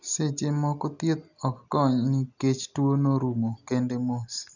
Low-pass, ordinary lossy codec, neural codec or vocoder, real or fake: 7.2 kHz; none; none; real